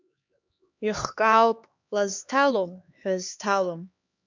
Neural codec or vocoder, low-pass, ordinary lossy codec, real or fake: codec, 16 kHz, 2 kbps, X-Codec, HuBERT features, trained on LibriSpeech; 7.2 kHz; MP3, 64 kbps; fake